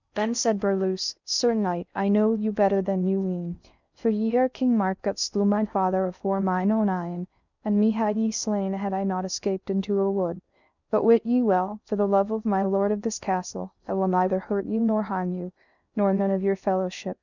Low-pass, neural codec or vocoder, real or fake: 7.2 kHz; codec, 16 kHz in and 24 kHz out, 0.6 kbps, FocalCodec, streaming, 4096 codes; fake